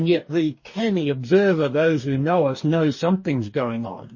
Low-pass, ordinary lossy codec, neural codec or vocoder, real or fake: 7.2 kHz; MP3, 32 kbps; codec, 44.1 kHz, 2.6 kbps, DAC; fake